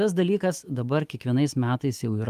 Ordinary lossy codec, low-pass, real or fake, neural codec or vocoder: Opus, 32 kbps; 14.4 kHz; fake; autoencoder, 48 kHz, 128 numbers a frame, DAC-VAE, trained on Japanese speech